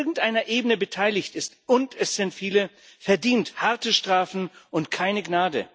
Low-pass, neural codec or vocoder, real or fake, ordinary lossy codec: none; none; real; none